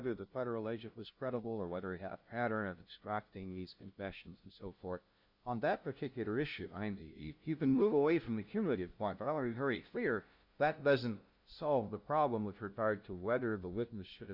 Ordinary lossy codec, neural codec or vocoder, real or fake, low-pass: Opus, 64 kbps; codec, 16 kHz, 0.5 kbps, FunCodec, trained on LibriTTS, 25 frames a second; fake; 5.4 kHz